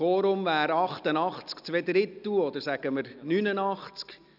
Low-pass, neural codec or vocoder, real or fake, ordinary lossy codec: 5.4 kHz; none; real; none